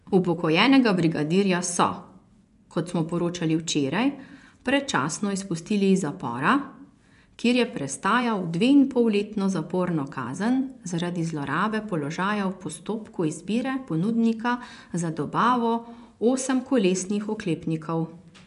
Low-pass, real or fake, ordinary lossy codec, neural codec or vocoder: 10.8 kHz; fake; none; vocoder, 24 kHz, 100 mel bands, Vocos